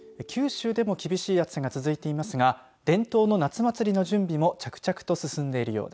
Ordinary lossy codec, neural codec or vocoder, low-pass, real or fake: none; none; none; real